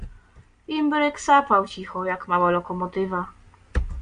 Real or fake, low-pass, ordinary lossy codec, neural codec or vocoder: real; 9.9 kHz; MP3, 96 kbps; none